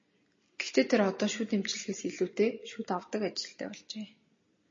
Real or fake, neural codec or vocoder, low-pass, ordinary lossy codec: real; none; 7.2 kHz; MP3, 32 kbps